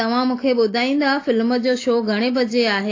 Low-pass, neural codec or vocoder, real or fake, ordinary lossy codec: 7.2 kHz; none; real; AAC, 32 kbps